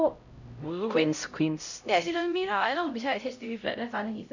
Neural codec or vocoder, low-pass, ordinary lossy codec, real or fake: codec, 16 kHz, 0.5 kbps, X-Codec, HuBERT features, trained on LibriSpeech; 7.2 kHz; none; fake